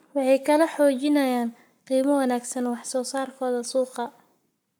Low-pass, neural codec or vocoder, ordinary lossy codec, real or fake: none; codec, 44.1 kHz, 7.8 kbps, Pupu-Codec; none; fake